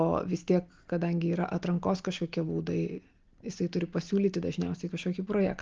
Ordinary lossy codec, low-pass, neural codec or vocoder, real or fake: Opus, 24 kbps; 7.2 kHz; none; real